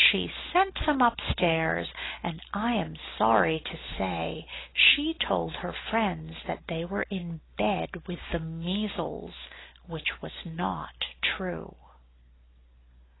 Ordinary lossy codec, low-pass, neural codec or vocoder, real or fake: AAC, 16 kbps; 7.2 kHz; none; real